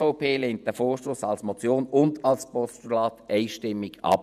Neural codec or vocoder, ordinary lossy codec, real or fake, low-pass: vocoder, 48 kHz, 128 mel bands, Vocos; none; fake; 14.4 kHz